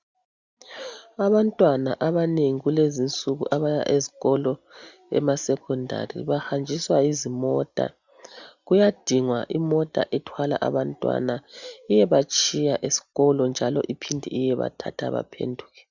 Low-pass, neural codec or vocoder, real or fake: 7.2 kHz; none; real